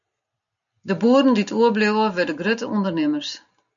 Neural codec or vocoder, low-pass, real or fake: none; 7.2 kHz; real